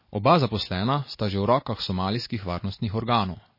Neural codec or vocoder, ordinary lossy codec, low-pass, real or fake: none; MP3, 24 kbps; 5.4 kHz; real